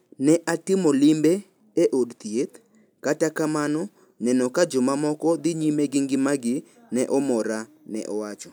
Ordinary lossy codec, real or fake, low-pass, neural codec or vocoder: none; real; none; none